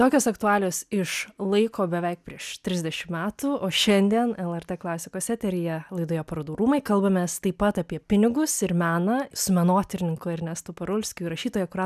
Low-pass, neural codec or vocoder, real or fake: 14.4 kHz; none; real